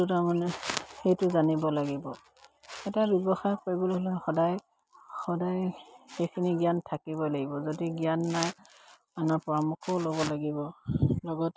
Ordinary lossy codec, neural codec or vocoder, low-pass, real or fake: none; none; none; real